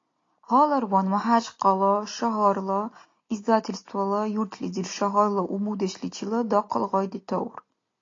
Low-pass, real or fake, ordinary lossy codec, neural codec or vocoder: 7.2 kHz; real; AAC, 32 kbps; none